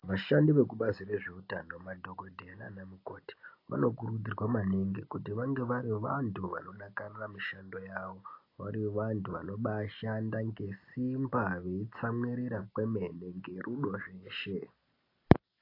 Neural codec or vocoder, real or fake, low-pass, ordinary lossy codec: none; real; 5.4 kHz; AAC, 32 kbps